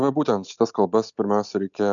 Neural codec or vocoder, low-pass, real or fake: none; 7.2 kHz; real